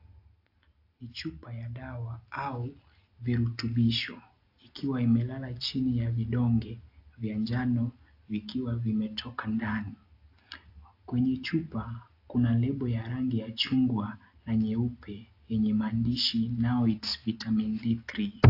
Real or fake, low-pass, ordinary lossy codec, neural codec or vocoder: real; 5.4 kHz; AAC, 32 kbps; none